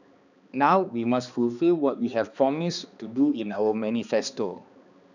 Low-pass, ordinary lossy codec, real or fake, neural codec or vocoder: 7.2 kHz; none; fake; codec, 16 kHz, 2 kbps, X-Codec, HuBERT features, trained on balanced general audio